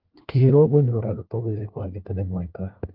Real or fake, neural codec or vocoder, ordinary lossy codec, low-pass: fake; codec, 16 kHz, 1 kbps, FunCodec, trained on LibriTTS, 50 frames a second; Opus, 32 kbps; 5.4 kHz